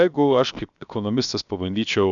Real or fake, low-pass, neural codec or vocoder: fake; 7.2 kHz; codec, 16 kHz, 0.7 kbps, FocalCodec